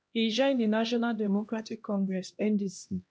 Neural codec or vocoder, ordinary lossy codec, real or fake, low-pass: codec, 16 kHz, 1 kbps, X-Codec, HuBERT features, trained on LibriSpeech; none; fake; none